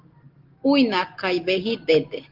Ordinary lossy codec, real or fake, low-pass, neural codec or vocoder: Opus, 16 kbps; real; 5.4 kHz; none